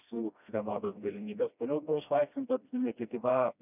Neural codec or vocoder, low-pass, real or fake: codec, 16 kHz, 1 kbps, FreqCodec, smaller model; 3.6 kHz; fake